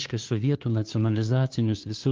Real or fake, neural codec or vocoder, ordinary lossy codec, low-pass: fake; codec, 16 kHz, 2 kbps, FunCodec, trained on LibriTTS, 25 frames a second; Opus, 32 kbps; 7.2 kHz